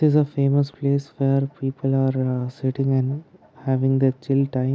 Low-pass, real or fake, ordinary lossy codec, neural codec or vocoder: none; real; none; none